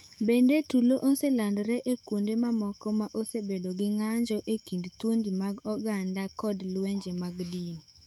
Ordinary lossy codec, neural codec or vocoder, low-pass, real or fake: none; autoencoder, 48 kHz, 128 numbers a frame, DAC-VAE, trained on Japanese speech; 19.8 kHz; fake